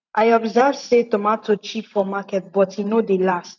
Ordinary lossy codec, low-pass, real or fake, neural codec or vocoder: none; 7.2 kHz; fake; vocoder, 44.1 kHz, 128 mel bands every 512 samples, BigVGAN v2